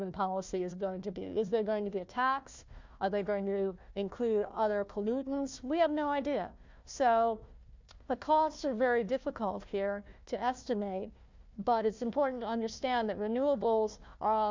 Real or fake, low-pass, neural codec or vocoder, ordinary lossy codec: fake; 7.2 kHz; codec, 16 kHz, 1 kbps, FunCodec, trained on Chinese and English, 50 frames a second; MP3, 64 kbps